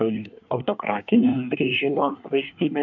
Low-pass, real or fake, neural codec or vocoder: 7.2 kHz; fake; codec, 24 kHz, 1 kbps, SNAC